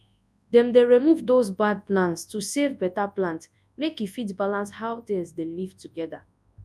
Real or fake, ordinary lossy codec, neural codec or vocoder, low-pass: fake; none; codec, 24 kHz, 0.9 kbps, WavTokenizer, large speech release; none